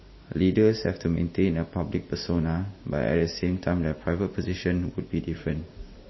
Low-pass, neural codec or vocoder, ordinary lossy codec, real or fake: 7.2 kHz; none; MP3, 24 kbps; real